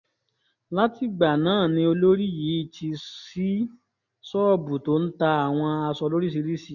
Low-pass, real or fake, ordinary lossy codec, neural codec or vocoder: 7.2 kHz; real; none; none